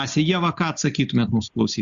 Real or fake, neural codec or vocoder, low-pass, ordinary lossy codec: real; none; 7.2 kHz; Opus, 64 kbps